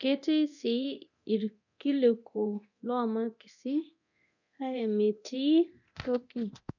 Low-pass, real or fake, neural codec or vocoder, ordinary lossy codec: 7.2 kHz; fake; codec, 24 kHz, 0.9 kbps, DualCodec; none